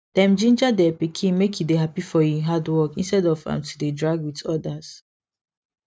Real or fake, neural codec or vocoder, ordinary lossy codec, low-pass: real; none; none; none